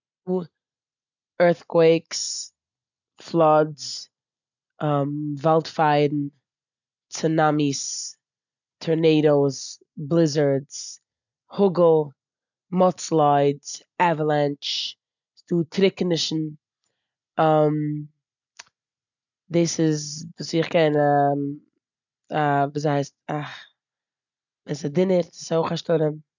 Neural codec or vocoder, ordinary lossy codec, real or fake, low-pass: none; none; real; 7.2 kHz